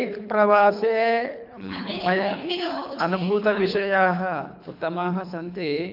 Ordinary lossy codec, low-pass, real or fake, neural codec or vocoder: AAC, 48 kbps; 5.4 kHz; fake; codec, 24 kHz, 3 kbps, HILCodec